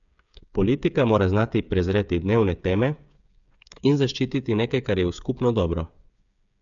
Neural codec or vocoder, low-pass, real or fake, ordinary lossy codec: codec, 16 kHz, 8 kbps, FreqCodec, smaller model; 7.2 kHz; fake; none